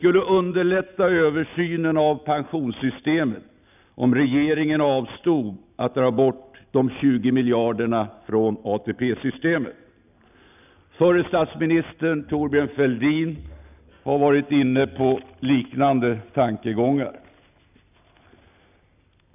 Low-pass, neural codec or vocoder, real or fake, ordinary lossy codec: 3.6 kHz; none; real; none